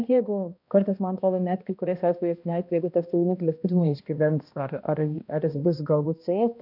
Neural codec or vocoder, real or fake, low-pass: codec, 16 kHz, 1 kbps, X-Codec, HuBERT features, trained on balanced general audio; fake; 5.4 kHz